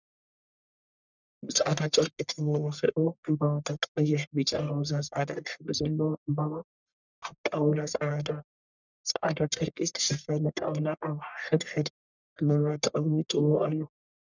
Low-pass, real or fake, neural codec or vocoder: 7.2 kHz; fake; codec, 44.1 kHz, 1.7 kbps, Pupu-Codec